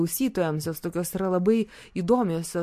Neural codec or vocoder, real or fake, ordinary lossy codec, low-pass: none; real; MP3, 64 kbps; 14.4 kHz